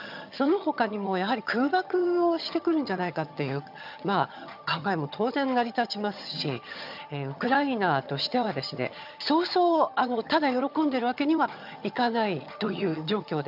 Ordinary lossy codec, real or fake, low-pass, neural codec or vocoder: none; fake; 5.4 kHz; vocoder, 22.05 kHz, 80 mel bands, HiFi-GAN